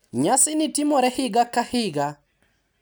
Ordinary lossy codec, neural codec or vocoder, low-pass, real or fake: none; none; none; real